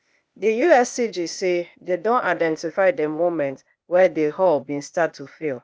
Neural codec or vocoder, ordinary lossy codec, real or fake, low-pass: codec, 16 kHz, 0.8 kbps, ZipCodec; none; fake; none